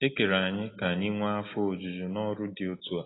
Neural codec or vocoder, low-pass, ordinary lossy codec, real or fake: none; 7.2 kHz; AAC, 16 kbps; real